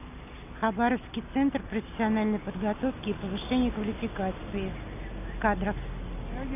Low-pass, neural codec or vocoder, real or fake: 3.6 kHz; none; real